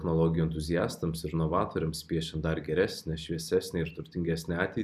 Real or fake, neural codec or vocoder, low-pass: real; none; 14.4 kHz